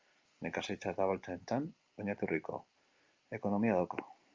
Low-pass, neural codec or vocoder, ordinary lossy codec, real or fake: 7.2 kHz; none; Opus, 32 kbps; real